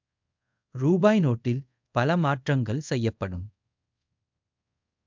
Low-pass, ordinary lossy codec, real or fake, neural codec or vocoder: 7.2 kHz; MP3, 64 kbps; fake; codec, 24 kHz, 0.5 kbps, DualCodec